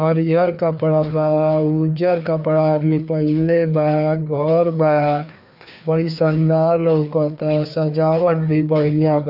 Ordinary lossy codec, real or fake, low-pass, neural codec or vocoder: none; fake; 5.4 kHz; codec, 16 kHz, 2 kbps, FreqCodec, larger model